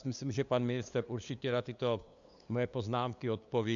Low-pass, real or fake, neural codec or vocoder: 7.2 kHz; fake; codec, 16 kHz, 2 kbps, FunCodec, trained on LibriTTS, 25 frames a second